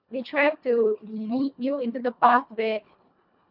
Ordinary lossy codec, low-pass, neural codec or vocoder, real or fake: none; 5.4 kHz; codec, 24 kHz, 1.5 kbps, HILCodec; fake